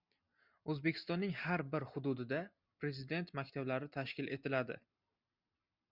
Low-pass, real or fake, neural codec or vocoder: 5.4 kHz; real; none